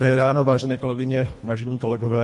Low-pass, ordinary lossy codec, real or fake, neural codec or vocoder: 10.8 kHz; MP3, 48 kbps; fake; codec, 24 kHz, 1.5 kbps, HILCodec